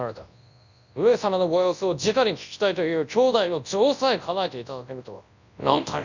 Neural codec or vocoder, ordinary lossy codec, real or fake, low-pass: codec, 24 kHz, 0.9 kbps, WavTokenizer, large speech release; none; fake; 7.2 kHz